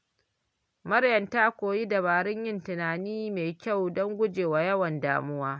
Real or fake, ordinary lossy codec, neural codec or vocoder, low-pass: real; none; none; none